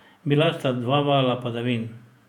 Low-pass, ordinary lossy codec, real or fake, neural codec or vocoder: 19.8 kHz; none; fake; vocoder, 48 kHz, 128 mel bands, Vocos